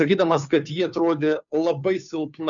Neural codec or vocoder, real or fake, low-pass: codec, 16 kHz, 2 kbps, FunCodec, trained on Chinese and English, 25 frames a second; fake; 7.2 kHz